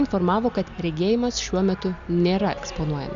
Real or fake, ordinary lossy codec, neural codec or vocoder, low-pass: real; MP3, 96 kbps; none; 7.2 kHz